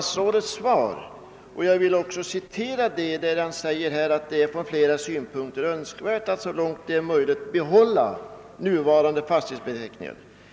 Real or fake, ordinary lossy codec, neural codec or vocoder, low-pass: real; none; none; none